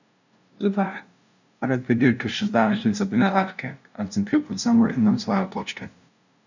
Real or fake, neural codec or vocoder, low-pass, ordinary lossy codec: fake; codec, 16 kHz, 0.5 kbps, FunCodec, trained on LibriTTS, 25 frames a second; 7.2 kHz; none